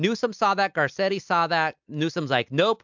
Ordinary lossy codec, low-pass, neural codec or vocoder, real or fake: MP3, 64 kbps; 7.2 kHz; none; real